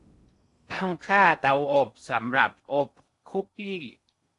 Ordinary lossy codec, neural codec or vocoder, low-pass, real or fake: AAC, 48 kbps; codec, 16 kHz in and 24 kHz out, 0.6 kbps, FocalCodec, streaming, 2048 codes; 10.8 kHz; fake